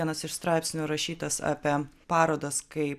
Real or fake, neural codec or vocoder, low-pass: real; none; 14.4 kHz